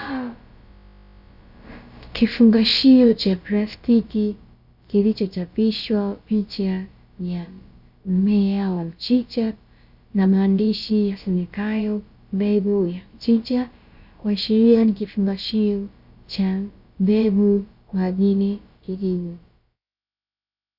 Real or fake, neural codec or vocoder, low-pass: fake; codec, 16 kHz, about 1 kbps, DyCAST, with the encoder's durations; 5.4 kHz